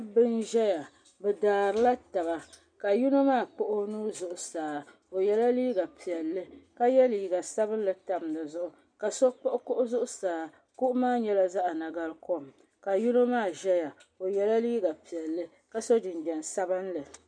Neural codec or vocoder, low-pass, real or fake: none; 9.9 kHz; real